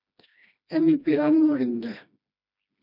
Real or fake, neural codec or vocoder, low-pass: fake; codec, 16 kHz, 1 kbps, FreqCodec, smaller model; 5.4 kHz